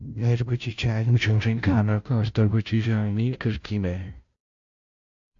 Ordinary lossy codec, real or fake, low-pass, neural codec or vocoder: AAC, 64 kbps; fake; 7.2 kHz; codec, 16 kHz, 0.5 kbps, FunCodec, trained on Chinese and English, 25 frames a second